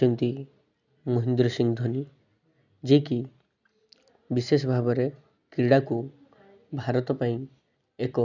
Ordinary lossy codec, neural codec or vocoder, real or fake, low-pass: none; none; real; 7.2 kHz